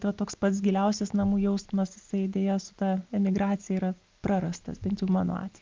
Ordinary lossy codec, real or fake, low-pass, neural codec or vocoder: Opus, 24 kbps; real; 7.2 kHz; none